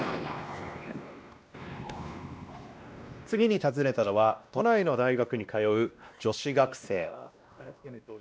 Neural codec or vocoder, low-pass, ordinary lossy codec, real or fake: codec, 16 kHz, 1 kbps, X-Codec, WavLM features, trained on Multilingual LibriSpeech; none; none; fake